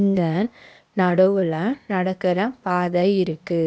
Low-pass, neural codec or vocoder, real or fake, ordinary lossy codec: none; codec, 16 kHz, 0.8 kbps, ZipCodec; fake; none